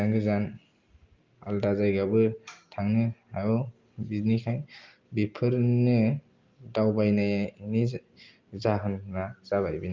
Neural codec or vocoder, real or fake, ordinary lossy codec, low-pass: none; real; Opus, 32 kbps; 7.2 kHz